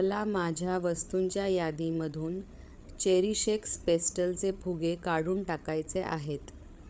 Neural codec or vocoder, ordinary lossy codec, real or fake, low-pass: codec, 16 kHz, 8 kbps, FreqCodec, larger model; none; fake; none